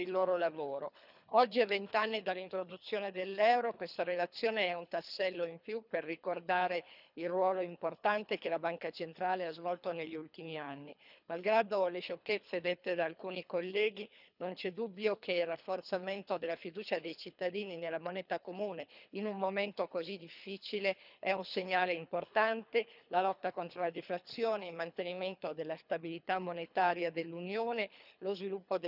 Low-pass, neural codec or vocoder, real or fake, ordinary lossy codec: 5.4 kHz; codec, 24 kHz, 3 kbps, HILCodec; fake; none